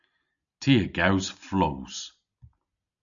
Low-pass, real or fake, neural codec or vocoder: 7.2 kHz; real; none